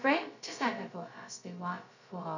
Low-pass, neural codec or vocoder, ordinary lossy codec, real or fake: 7.2 kHz; codec, 16 kHz, 0.2 kbps, FocalCodec; none; fake